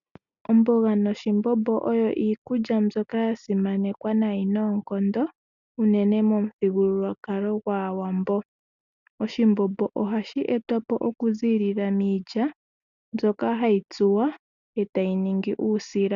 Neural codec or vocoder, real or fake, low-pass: none; real; 7.2 kHz